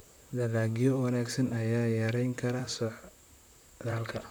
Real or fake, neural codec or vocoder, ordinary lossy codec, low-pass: fake; vocoder, 44.1 kHz, 128 mel bands, Pupu-Vocoder; none; none